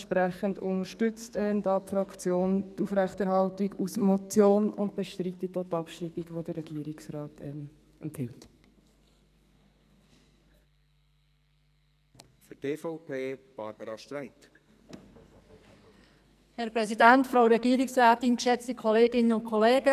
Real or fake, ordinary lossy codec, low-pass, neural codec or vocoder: fake; none; 14.4 kHz; codec, 44.1 kHz, 2.6 kbps, SNAC